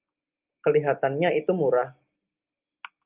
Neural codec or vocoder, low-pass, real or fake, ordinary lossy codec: none; 3.6 kHz; real; Opus, 24 kbps